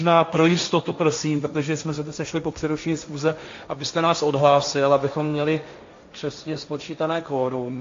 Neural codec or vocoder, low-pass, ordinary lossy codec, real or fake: codec, 16 kHz, 1.1 kbps, Voila-Tokenizer; 7.2 kHz; AAC, 48 kbps; fake